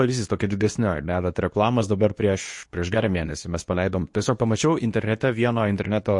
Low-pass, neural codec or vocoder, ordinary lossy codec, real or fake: 10.8 kHz; codec, 24 kHz, 0.9 kbps, WavTokenizer, medium speech release version 2; MP3, 48 kbps; fake